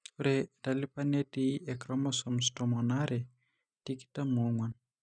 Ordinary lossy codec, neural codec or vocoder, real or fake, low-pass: none; none; real; 9.9 kHz